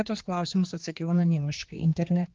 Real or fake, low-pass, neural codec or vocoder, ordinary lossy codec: fake; 7.2 kHz; codec, 16 kHz, 2 kbps, X-Codec, HuBERT features, trained on general audio; Opus, 32 kbps